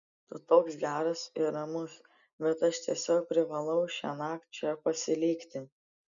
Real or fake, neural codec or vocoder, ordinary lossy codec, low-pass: real; none; MP3, 96 kbps; 7.2 kHz